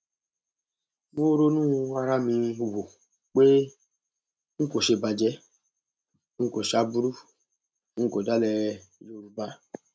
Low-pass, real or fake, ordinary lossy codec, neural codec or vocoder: none; real; none; none